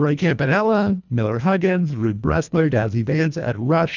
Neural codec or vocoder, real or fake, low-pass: codec, 24 kHz, 1.5 kbps, HILCodec; fake; 7.2 kHz